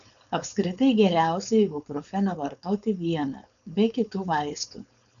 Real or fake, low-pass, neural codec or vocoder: fake; 7.2 kHz; codec, 16 kHz, 4.8 kbps, FACodec